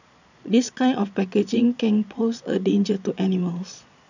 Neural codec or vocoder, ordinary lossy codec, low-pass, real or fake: vocoder, 22.05 kHz, 80 mel bands, Vocos; none; 7.2 kHz; fake